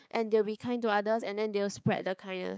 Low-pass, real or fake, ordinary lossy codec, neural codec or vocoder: none; fake; none; codec, 16 kHz, 4 kbps, X-Codec, HuBERT features, trained on balanced general audio